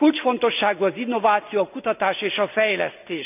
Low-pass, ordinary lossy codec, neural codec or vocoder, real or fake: 3.6 kHz; none; none; real